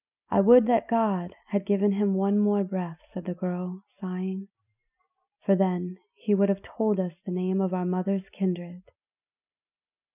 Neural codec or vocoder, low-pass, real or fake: none; 3.6 kHz; real